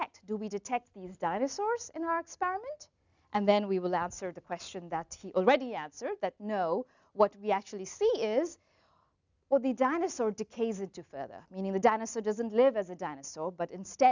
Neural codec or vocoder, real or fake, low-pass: none; real; 7.2 kHz